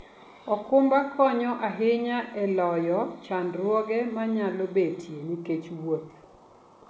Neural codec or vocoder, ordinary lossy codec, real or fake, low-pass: none; none; real; none